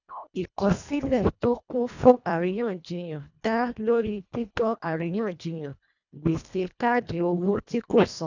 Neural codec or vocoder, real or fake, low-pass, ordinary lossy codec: codec, 24 kHz, 1.5 kbps, HILCodec; fake; 7.2 kHz; none